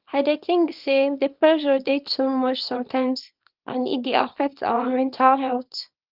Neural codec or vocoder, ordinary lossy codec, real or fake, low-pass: codec, 24 kHz, 0.9 kbps, WavTokenizer, small release; Opus, 24 kbps; fake; 5.4 kHz